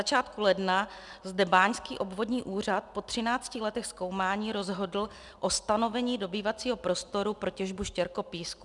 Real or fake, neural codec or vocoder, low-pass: real; none; 10.8 kHz